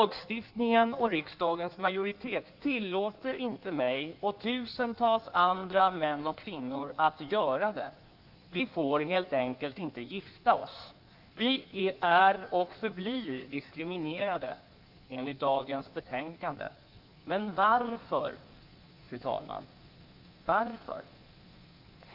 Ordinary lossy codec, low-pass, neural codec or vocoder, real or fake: none; 5.4 kHz; codec, 16 kHz in and 24 kHz out, 1.1 kbps, FireRedTTS-2 codec; fake